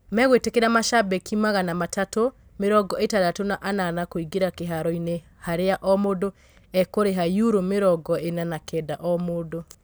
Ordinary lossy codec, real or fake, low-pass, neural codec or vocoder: none; real; none; none